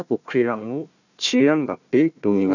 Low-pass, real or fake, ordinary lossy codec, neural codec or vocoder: 7.2 kHz; fake; none; codec, 16 kHz, 1 kbps, FunCodec, trained on Chinese and English, 50 frames a second